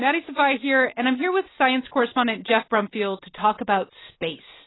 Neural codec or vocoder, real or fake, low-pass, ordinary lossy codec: none; real; 7.2 kHz; AAC, 16 kbps